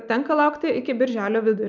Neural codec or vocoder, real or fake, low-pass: none; real; 7.2 kHz